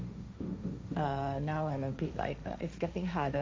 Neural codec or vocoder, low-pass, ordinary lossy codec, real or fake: codec, 16 kHz, 1.1 kbps, Voila-Tokenizer; none; none; fake